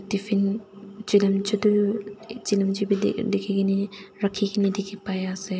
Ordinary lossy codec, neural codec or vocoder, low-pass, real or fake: none; none; none; real